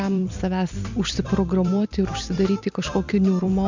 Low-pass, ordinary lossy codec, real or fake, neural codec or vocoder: 7.2 kHz; MP3, 64 kbps; fake; vocoder, 44.1 kHz, 128 mel bands every 256 samples, BigVGAN v2